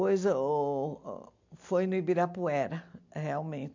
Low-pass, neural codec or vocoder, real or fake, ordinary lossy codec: 7.2 kHz; none; real; MP3, 64 kbps